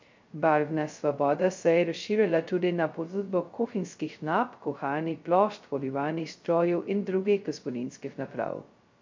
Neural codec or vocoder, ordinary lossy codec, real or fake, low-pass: codec, 16 kHz, 0.2 kbps, FocalCodec; MP3, 48 kbps; fake; 7.2 kHz